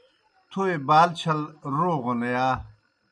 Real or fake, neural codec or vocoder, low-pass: real; none; 9.9 kHz